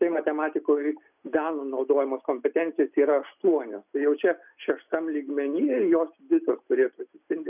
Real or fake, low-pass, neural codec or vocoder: real; 3.6 kHz; none